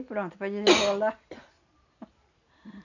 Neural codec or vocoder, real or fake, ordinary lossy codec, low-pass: none; real; none; 7.2 kHz